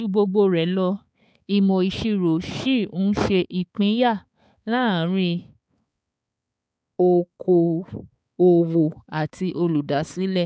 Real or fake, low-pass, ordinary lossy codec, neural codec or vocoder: fake; none; none; codec, 16 kHz, 4 kbps, X-Codec, HuBERT features, trained on balanced general audio